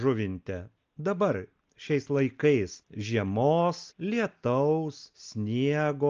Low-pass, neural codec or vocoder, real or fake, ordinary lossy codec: 7.2 kHz; none; real; Opus, 24 kbps